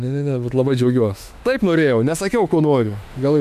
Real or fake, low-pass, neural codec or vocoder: fake; 14.4 kHz; autoencoder, 48 kHz, 32 numbers a frame, DAC-VAE, trained on Japanese speech